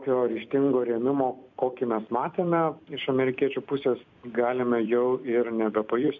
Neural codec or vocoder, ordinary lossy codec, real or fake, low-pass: vocoder, 44.1 kHz, 128 mel bands every 256 samples, BigVGAN v2; MP3, 64 kbps; fake; 7.2 kHz